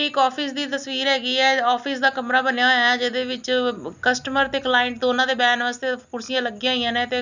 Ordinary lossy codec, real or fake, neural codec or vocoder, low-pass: none; real; none; 7.2 kHz